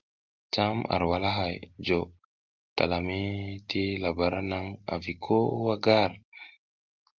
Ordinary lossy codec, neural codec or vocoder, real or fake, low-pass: Opus, 24 kbps; none; real; 7.2 kHz